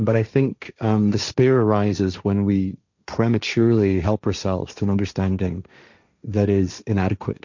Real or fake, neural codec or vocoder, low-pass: fake; codec, 16 kHz, 1.1 kbps, Voila-Tokenizer; 7.2 kHz